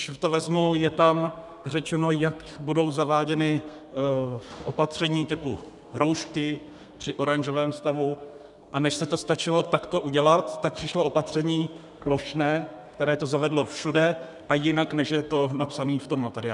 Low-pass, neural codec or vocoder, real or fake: 10.8 kHz; codec, 32 kHz, 1.9 kbps, SNAC; fake